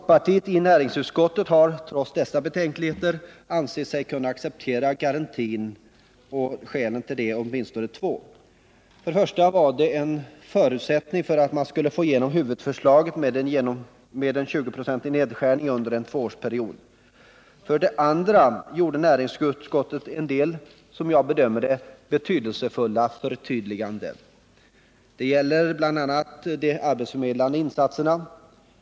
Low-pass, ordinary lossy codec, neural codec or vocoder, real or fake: none; none; none; real